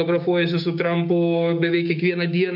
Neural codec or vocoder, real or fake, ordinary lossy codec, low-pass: codec, 16 kHz, 6 kbps, DAC; fake; AAC, 48 kbps; 5.4 kHz